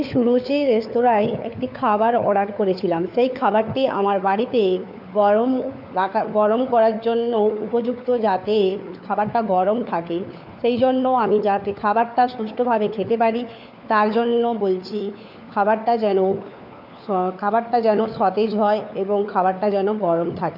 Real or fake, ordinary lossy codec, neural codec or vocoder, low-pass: fake; none; codec, 16 kHz, 4 kbps, FunCodec, trained on LibriTTS, 50 frames a second; 5.4 kHz